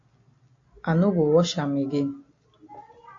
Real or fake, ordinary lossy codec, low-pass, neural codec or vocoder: real; AAC, 48 kbps; 7.2 kHz; none